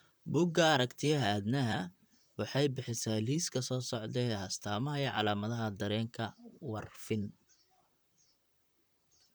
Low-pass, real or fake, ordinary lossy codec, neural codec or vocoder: none; fake; none; vocoder, 44.1 kHz, 128 mel bands, Pupu-Vocoder